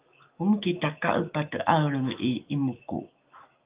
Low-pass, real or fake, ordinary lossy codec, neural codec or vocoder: 3.6 kHz; fake; Opus, 24 kbps; autoencoder, 48 kHz, 128 numbers a frame, DAC-VAE, trained on Japanese speech